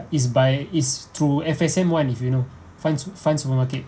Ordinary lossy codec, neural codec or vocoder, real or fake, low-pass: none; none; real; none